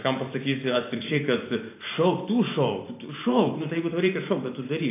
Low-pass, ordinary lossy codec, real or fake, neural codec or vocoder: 3.6 kHz; AAC, 24 kbps; fake; vocoder, 44.1 kHz, 128 mel bands every 512 samples, BigVGAN v2